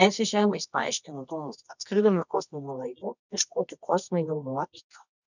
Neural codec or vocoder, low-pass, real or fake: codec, 24 kHz, 0.9 kbps, WavTokenizer, medium music audio release; 7.2 kHz; fake